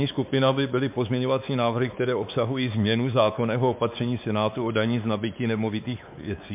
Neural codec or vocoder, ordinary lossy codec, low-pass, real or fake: codec, 16 kHz, 4 kbps, X-Codec, WavLM features, trained on Multilingual LibriSpeech; MP3, 32 kbps; 3.6 kHz; fake